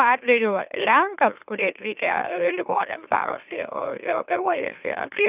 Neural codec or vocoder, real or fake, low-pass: autoencoder, 44.1 kHz, a latent of 192 numbers a frame, MeloTTS; fake; 3.6 kHz